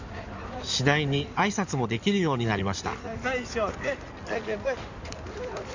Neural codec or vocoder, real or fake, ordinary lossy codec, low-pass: codec, 16 kHz in and 24 kHz out, 2.2 kbps, FireRedTTS-2 codec; fake; none; 7.2 kHz